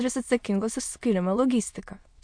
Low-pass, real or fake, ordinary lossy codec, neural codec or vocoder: 9.9 kHz; fake; MP3, 64 kbps; autoencoder, 22.05 kHz, a latent of 192 numbers a frame, VITS, trained on many speakers